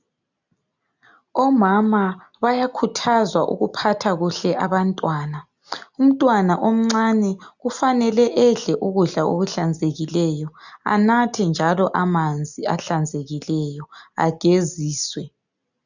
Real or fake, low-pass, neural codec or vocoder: real; 7.2 kHz; none